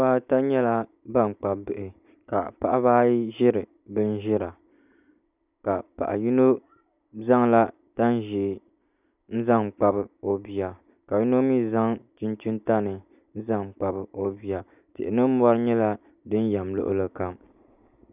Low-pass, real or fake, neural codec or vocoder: 3.6 kHz; real; none